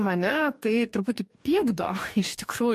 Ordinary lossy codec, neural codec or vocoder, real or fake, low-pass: MP3, 64 kbps; codec, 44.1 kHz, 2.6 kbps, DAC; fake; 14.4 kHz